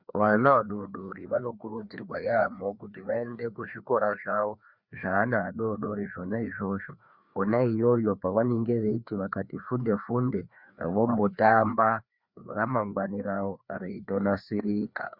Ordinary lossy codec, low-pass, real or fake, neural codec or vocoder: Opus, 64 kbps; 5.4 kHz; fake; codec, 16 kHz, 2 kbps, FreqCodec, larger model